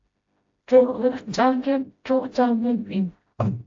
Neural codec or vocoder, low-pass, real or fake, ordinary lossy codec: codec, 16 kHz, 0.5 kbps, FreqCodec, smaller model; 7.2 kHz; fake; AAC, 48 kbps